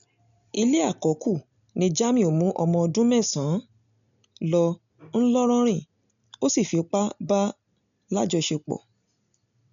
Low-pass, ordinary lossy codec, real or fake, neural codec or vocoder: 7.2 kHz; none; real; none